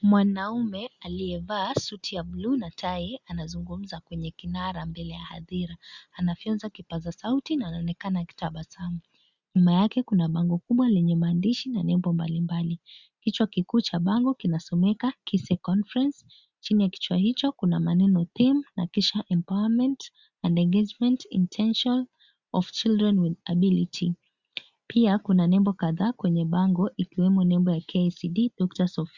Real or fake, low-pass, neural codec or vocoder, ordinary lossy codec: real; 7.2 kHz; none; Opus, 64 kbps